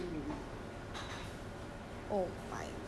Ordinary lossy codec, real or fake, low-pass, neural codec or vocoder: none; real; none; none